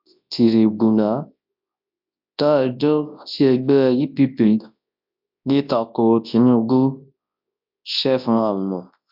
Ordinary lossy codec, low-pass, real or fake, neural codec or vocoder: MP3, 48 kbps; 5.4 kHz; fake; codec, 24 kHz, 0.9 kbps, WavTokenizer, large speech release